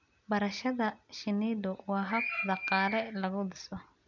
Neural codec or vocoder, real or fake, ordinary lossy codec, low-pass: none; real; none; 7.2 kHz